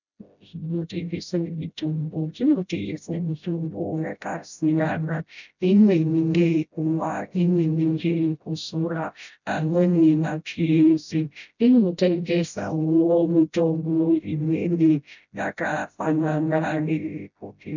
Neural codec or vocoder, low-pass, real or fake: codec, 16 kHz, 0.5 kbps, FreqCodec, smaller model; 7.2 kHz; fake